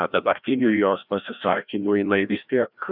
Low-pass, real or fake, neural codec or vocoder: 5.4 kHz; fake; codec, 16 kHz, 1 kbps, FreqCodec, larger model